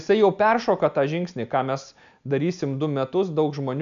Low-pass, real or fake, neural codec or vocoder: 7.2 kHz; real; none